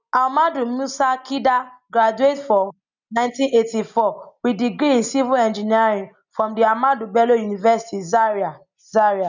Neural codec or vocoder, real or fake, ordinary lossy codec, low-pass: none; real; none; 7.2 kHz